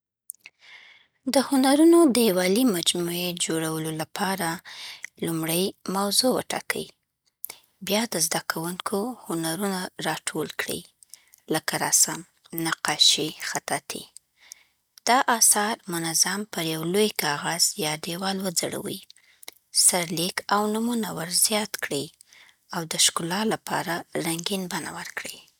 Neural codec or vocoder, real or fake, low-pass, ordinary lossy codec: none; real; none; none